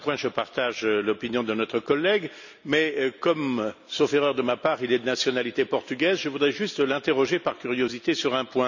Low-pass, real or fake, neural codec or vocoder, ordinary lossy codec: 7.2 kHz; real; none; none